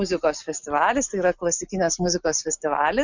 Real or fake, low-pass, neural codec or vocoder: real; 7.2 kHz; none